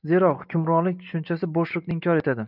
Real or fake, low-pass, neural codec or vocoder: real; 5.4 kHz; none